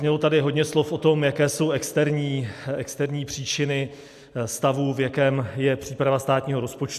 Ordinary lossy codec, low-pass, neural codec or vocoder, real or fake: AAC, 96 kbps; 14.4 kHz; none; real